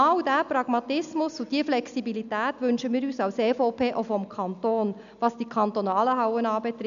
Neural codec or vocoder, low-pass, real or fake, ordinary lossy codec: none; 7.2 kHz; real; none